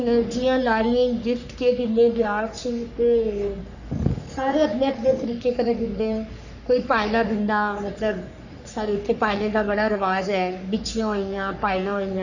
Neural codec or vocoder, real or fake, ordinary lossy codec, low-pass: codec, 44.1 kHz, 3.4 kbps, Pupu-Codec; fake; none; 7.2 kHz